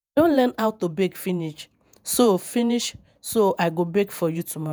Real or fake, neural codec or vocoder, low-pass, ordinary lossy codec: fake; vocoder, 48 kHz, 128 mel bands, Vocos; none; none